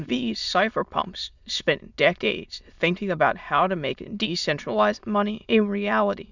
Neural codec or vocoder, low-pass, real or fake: autoencoder, 22.05 kHz, a latent of 192 numbers a frame, VITS, trained on many speakers; 7.2 kHz; fake